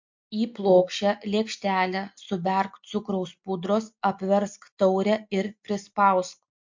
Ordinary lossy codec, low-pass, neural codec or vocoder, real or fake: MP3, 48 kbps; 7.2 kHz; none; real